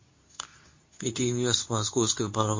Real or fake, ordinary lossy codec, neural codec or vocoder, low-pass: fake; MP3, 32 kbps; codec, 24 kHz, 0.9 kbps, WavTokenizer, medium speech release version 2; 7.2 kHz